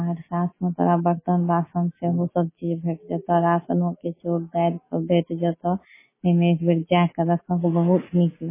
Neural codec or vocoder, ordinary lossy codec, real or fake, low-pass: none; MP3, 16 kbps; real; 3.6 kHz